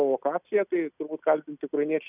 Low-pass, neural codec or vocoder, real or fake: 3.6 kHz; none; real